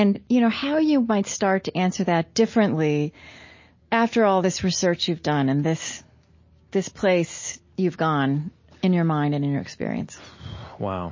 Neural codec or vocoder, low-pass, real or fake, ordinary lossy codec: none; 7.2 kHz; real; MP3, 32 kbps